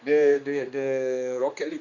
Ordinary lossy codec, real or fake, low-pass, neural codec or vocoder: Opus, 64 kbps; fake; 7.2 kHz; codec, 16 kHz, 2 kbps, X-Codec, HuBERT features, trained on general audio